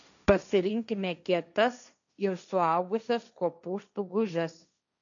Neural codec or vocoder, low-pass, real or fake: codec, 16 kHz, 1.1 kbps, Voila-Tokenizer; 7.2 kHz; fake